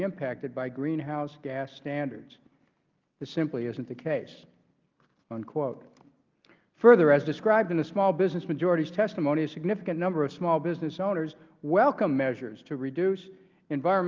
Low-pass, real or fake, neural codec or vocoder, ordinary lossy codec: 7.2 kHz; real; none; Opus, 32 kbps